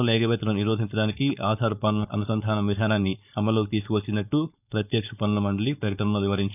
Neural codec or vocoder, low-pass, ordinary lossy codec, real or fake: codec, 16 kHz, 4.8 kbps, FACodec; 3.6 kHz; none; fake